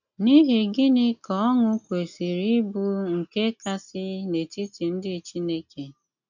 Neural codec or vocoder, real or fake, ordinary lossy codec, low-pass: none; real; none; 7.2 kHz